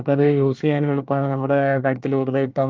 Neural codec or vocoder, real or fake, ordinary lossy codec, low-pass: codec, 24 kHz, 1 kbps, SNAC; fake; Opus, 24 kbps; 7.2 kHz